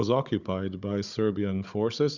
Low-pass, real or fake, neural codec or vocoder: 7.2 kHz; fake; codec, 16 kHz, 16 kbps, FunCodec, trained on Chinese and English, 50 frames a second